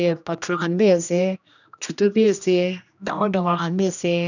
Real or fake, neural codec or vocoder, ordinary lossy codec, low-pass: fake; codec, 16 kHz, 1 kbps, X-Codec, HuBERT features, trained on general audio; none; 7.2 kHz